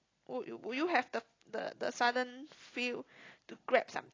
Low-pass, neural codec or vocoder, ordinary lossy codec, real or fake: 7.2 kHz; none; AAC, 32 kbps; real